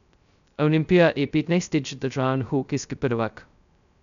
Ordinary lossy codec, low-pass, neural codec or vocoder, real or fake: none; 7.2 kHz; codec, 16 kHz, 0.2 kbps, FocalCodec; fake